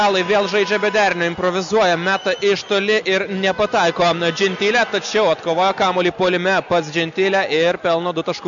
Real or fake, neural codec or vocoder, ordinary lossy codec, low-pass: real; none; MP3, 96 kbps; 7.2 kHz